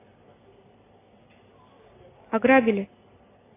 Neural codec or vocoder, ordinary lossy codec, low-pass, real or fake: none; AAC, 16 kbps; 3.6 kHz; real